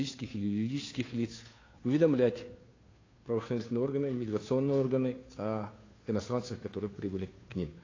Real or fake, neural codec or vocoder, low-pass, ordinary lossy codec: fake; codec, 16 kHz, 2 kbps, FunCodec, trained on Chinese and English, 25 frames a second; 7.2 kHz; AAC, 32 kbps